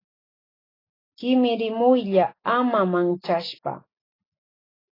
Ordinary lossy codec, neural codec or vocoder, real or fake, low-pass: AAC, 24 kbps; none; real; 5.4 kHz